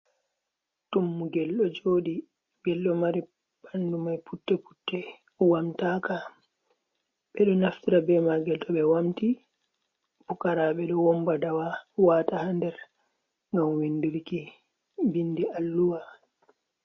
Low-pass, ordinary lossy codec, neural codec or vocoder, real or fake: 7.2 kHz; MP3, 32 kbps; none; real